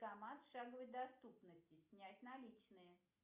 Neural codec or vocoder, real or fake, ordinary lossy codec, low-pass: none; real; Opus, 64 kbps; 3.6 kHz